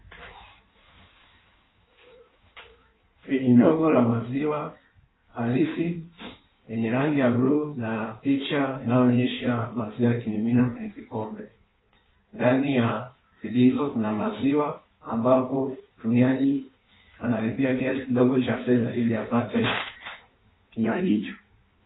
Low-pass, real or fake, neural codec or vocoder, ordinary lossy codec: 7.2 kHz; fake; codec, 16 kHz in and 24 kHz out, 1.1 kbps, FireRedTTS-2 codec; AAC, 16 kbps